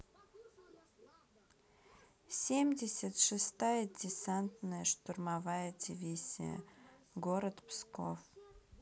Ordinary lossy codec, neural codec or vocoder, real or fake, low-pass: none; none; real; none